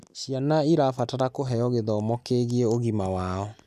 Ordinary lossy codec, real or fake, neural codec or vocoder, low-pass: none; real; none; 14.4 kHz